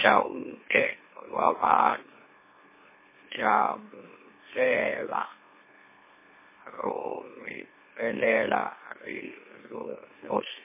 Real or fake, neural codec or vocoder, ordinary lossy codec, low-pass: fake; autoencoder, 44.1 kHz, a latent of 192 numbers a frame, MeloTTS; MP3, 16 kbps; 3.6 kHz